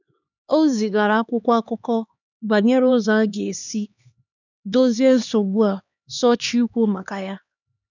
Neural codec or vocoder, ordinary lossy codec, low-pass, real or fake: codec, 16 kHz, 2 kbps, X-Codec, HuBERT features, trained on LibriSpeech; none; 7.2 kHz; fake